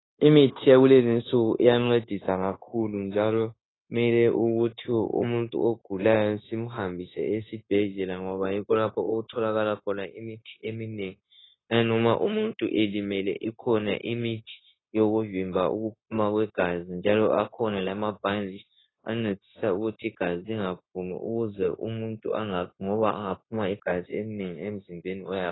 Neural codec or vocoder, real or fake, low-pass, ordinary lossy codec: codec, 16 kHz, 0.9 kbps, LongCat-Audio-Codec; fake; 7.2 kHz; AAC, 16 kbps